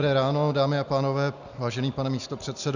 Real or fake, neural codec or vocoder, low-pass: real; none; 7.2 kHz